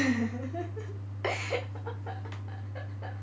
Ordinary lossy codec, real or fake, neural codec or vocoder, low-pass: none; real; none; none